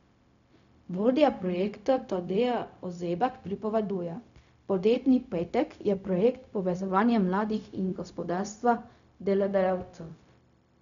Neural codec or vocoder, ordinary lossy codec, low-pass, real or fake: codec, 16 kHz, 0.4 kbps, LongCat-Audio-Codec; none; 7.2 kHz; fake